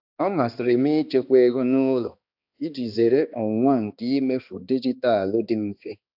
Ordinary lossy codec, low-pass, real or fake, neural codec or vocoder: AAC, 48 kbps; 5.4 kHz; fake; codec, 16 kHz, 2 kbps, X-Codec, HuBERT features, trained on balanced general audio